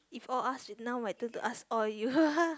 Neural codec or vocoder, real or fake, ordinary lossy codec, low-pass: none; real; none; none